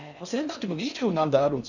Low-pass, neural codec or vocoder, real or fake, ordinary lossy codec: 7.2 kHz; codec, 16 kHz in and 24 kHz out, 0.6 kbps, FocalCodec, streaming, 2048 codes; fake; none